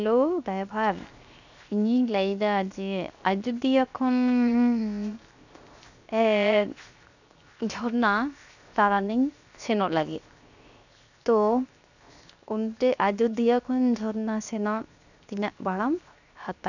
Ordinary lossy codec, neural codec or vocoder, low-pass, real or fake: none; codec, 16 kHz, 0.7 kbps, FocalCodec; 7.2 kHz; fake